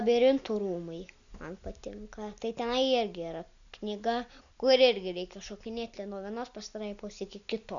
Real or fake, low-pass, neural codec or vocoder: real; 7.2 kHz; none